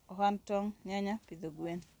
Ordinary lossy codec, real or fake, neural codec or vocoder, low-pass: none; real; none; none